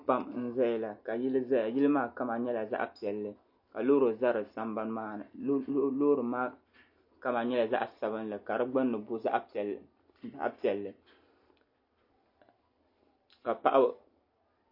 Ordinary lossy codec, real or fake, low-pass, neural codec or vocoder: MP3, 32 kbps; real; 5.4 kHz; none